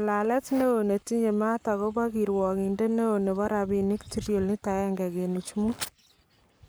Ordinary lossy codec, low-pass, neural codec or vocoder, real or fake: none; none; codec, 44.1 kHz, 7.8 kbps, Pupu-Codec; fake